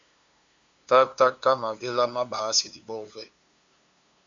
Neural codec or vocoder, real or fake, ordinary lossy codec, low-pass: codec, 16 kHz, 2 kbps, FunCodec, trained on LibriTTS, 25 frames a second; fake; Opus, 64 kbps; 7.2 kHz